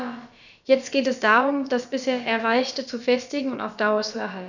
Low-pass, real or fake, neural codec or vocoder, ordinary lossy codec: 7.2 kHz; fake; codec, 16 kHz, about 1 kbps, DyCAST, with the encoder's durations; none